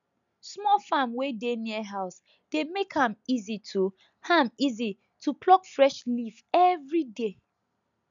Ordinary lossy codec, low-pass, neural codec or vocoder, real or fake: none; 7.2 kHz; none; real